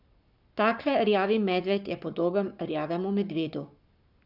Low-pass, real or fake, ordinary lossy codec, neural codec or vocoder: 5.4 kHz; fake; none; codec, 44.1 kHz, 7.8 kbps, Pupu-Codec